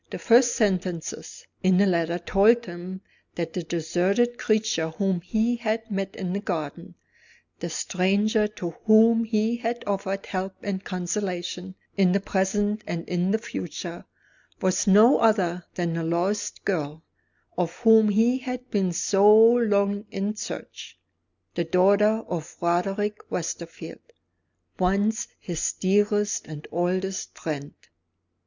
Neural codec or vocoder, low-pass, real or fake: none; 7.2 kHz; real